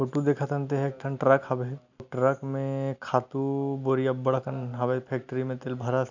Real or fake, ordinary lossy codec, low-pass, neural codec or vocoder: real; none; 7.2 kHz; none